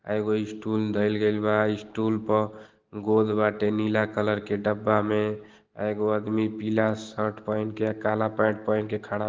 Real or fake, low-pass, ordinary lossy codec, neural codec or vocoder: real; 7.2 kHz; Opus, 16 kbps; none